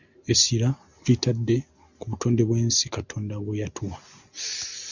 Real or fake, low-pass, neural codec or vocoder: real; 7.2 kHz; none